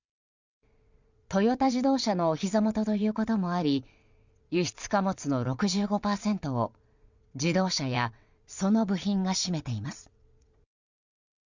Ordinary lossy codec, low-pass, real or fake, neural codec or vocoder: Opus, 64 kbps; 7.2 kHz; fake; vocoder, 22.05 kHz, 80 mel bands, WaveNeXt